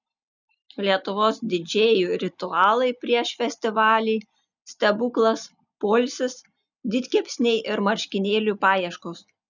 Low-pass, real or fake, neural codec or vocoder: 7.2 kHz; real; none